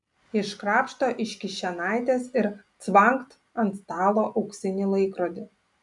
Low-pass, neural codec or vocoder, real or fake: 10.8 kHz; none; real